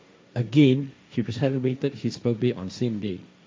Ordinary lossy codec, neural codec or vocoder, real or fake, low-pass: none; codec, 16 kHz, 1.1 kbps, Voila-Tokenizer; fake; none